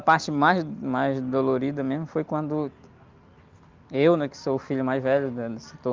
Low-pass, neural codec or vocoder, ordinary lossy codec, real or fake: 7.2 kHz; none; Opus, 24 kbps; real